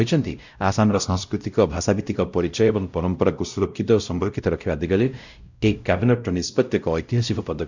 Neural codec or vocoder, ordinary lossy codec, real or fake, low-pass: codec, 16 kHz, 0.5 kbps, X-Codec, WavLM features, trained on Multilingual LibriSpeech; none; fake; 7.2 kHz